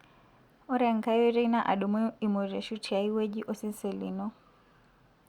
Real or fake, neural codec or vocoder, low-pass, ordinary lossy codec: real; none; 19.8 kHz; Opus, 64 kbps